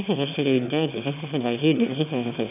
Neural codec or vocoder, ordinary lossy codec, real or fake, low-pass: autoencoder, 22.05 kHz, a latent of 192 numbers a frame, VITS, trained on one speaker; none; fake; 3.6 kHz